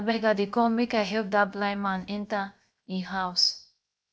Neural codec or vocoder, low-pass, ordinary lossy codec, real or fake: codec, 16 kHz, 0.7 kbps, FocalCodec; none; none; fake